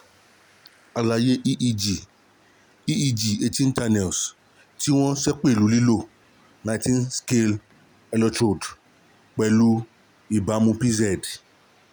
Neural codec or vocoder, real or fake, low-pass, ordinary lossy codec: none; real; none; none